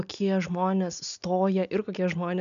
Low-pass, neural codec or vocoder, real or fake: 7.2 kHz; none; real